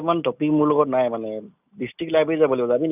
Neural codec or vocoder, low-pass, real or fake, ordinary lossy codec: none; 3.6 kHz; real; none